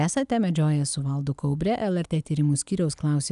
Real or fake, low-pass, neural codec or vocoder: real; 10.8 kHz; none